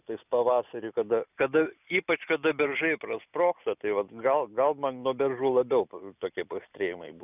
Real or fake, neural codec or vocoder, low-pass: real; none; 3.6 kHz